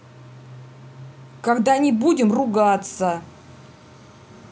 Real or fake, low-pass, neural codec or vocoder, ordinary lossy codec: real; none; none; none